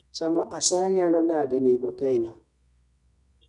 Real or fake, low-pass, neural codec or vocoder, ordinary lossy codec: fake; 10.8 kHz; codec, 24 kHz, 0.9 kbps, WavTokenizer, medium music audio release; none